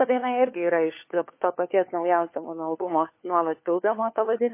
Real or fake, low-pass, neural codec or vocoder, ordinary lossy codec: fake; 3.6 kHz; codec, 16 kHz, 4 kbps, FunCodec, trained on LibriTTS, 50 frames a second; MP3, 24 kbps